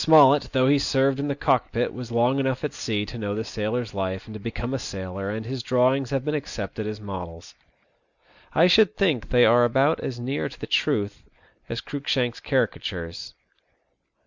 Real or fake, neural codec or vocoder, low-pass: real; none; 7.2 kHz